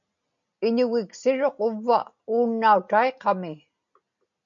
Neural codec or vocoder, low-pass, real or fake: none; 7.2 kHz; real